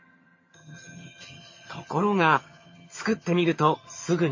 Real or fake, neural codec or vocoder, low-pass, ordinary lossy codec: fake; vocoder, 22.05 kHz, 80 mel bands, HiFi-GAN; 7.2 kHz; MP3, 32 kbps